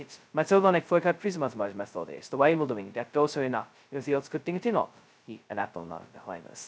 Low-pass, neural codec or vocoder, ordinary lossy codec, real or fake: none; codec, 16 kHz, 0.2 kbps, FocalCodec; none; fake